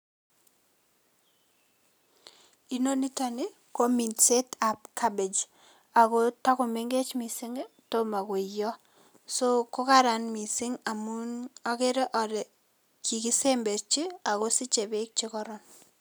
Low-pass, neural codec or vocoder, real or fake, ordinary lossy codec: none; none; real; none